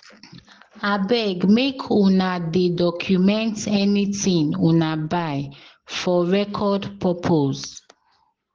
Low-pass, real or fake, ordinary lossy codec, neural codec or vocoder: 7.2 kHz; real; Opus, 16 kbps; none